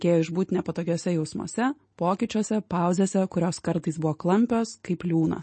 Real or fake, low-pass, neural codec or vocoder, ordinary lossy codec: real; 9.9 kHz; none; MP3, 32 kbps